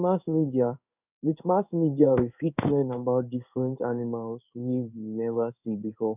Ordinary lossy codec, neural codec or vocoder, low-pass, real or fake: none; codec, 16 kHz in and 24 kHz out, 1 kbps, XY-Tokenizer; 3.6 kHz; fake